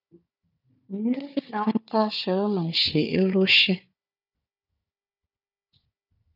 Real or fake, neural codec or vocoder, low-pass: fake; codec, 16 kHz, 4 kbps, FunCodec, trained on Chinese and English, 50 frames a second; 5.4 kHz